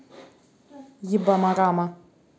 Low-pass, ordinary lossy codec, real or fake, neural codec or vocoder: none; none; real; none